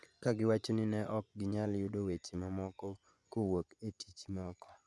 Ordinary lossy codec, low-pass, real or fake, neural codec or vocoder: none; none; fake; vocoder, 24 kHz, 100 mel bands, Vocos